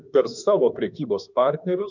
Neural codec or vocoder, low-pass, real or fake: autoencoder, 48 kHz, 32 numbers a frame, DAC-VAE, trained on Japanese speech; 7.2 kHz; fake